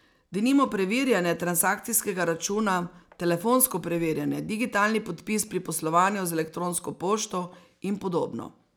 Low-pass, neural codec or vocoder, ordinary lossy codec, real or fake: none; none; none; real